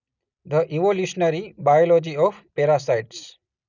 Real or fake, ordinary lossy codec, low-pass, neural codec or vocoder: real; none; 7.2 kHz; none